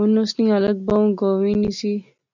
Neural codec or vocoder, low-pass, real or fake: none; 7.2 kHz; real